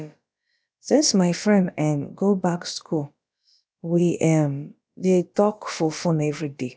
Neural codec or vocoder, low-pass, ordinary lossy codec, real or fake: codec, 16 kHz, about 1 kbps, DyCAST, with the encoder's durations; none; none; fake